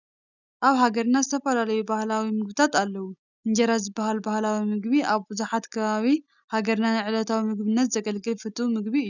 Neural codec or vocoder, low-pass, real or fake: none; 7.2 kHz; real